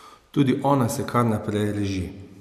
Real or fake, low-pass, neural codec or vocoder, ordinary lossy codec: real; 14.4 kHz; none; none